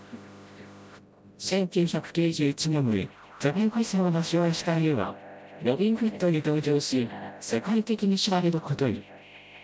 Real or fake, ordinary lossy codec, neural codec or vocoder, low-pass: fake; none; codec, 16 kHz, 0.5 kbps, FreqCodec, smaller model; none